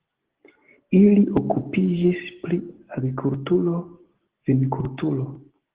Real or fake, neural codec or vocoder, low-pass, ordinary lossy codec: real; none; 3.6 kHz; Opus, 16 kbps